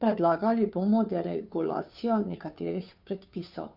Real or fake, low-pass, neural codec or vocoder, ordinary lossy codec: fake; 5.4 kHz; codec, 16 kHz, 2 kbps, FunCodec, trained on Chinese and English, 25 frames a second; none